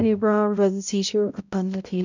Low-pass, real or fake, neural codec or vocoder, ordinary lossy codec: 7.2 kHz; fake; codec, 16 kHz, 0.5 kbps, X-Codec, HuBERT features, trained on balanced general audio; none